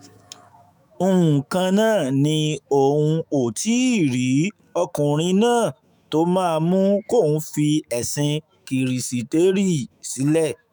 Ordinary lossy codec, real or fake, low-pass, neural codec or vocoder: none; fake; none; autoencoder, 48 kHz, 128 numbers a frame, DAC-VAE, trained on Japanese speech